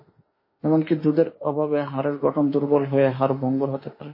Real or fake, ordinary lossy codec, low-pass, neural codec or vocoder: fake; MP3, 24 kbps; 5.4 kHz; autoencoder, 48 kHz, 32 numbers a frame, DAC-VAE, trained on Japanese speech